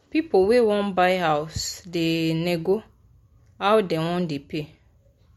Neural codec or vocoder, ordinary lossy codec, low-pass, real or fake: none; MP3, 64 kbps; 19.8 kHz; real